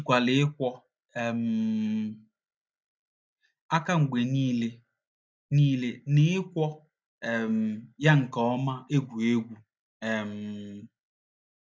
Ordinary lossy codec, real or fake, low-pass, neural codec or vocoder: none; real; none; none